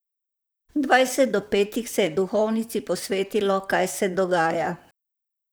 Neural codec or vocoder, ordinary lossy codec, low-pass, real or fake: vocoder, 44.1 kHz, 128 mel bands, Pupu-Vocoder; none; none; fake